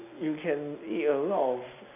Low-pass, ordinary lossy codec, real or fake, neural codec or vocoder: 3.6 kHz; none; real; none